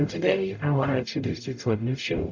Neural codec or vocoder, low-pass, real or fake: codec, 44.1 kHz, 0.9 kbps, DAC; 7.2 kHz; fake